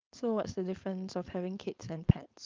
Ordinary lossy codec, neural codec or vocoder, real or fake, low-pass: Opus, 24 kbps; codec, 16 kHz, 4.8 kbps, FACodec; fake; 7.2 kHz